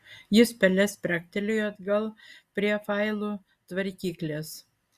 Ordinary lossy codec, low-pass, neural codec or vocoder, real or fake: Opus, 64 kbps; 14.4 kHz; none; real